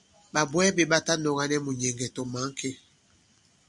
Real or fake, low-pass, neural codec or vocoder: real; 10.8 kHz; none